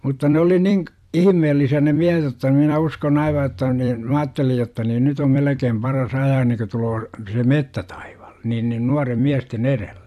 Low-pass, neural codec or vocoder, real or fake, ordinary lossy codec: 14.4 kHz; vocoder, 44.1 kHz, 128 mel bands every 256 samples, BigVGAN v2; fake; AAC, 96 kbps